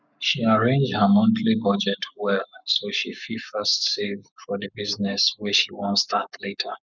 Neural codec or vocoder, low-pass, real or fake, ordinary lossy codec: codec, 44.1 kHz, 7.8 kbps, Pupu-Codec; 7.2 kHz; fake; none